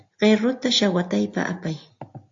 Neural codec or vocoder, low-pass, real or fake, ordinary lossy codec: none; 7.2 kHz; real; AAC, 48 kbps